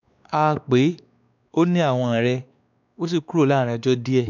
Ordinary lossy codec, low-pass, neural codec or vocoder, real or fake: none; 7.2 kHz; codec, 16 kHz, 2 kbps, X-Codec, WavLM features, trained on Multilingual LibriSpeech; fake